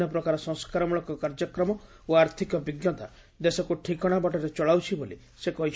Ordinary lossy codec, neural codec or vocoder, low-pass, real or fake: none; none; none; real